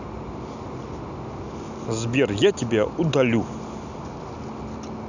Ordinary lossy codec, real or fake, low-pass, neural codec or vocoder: none; real; 7.2 kHz; none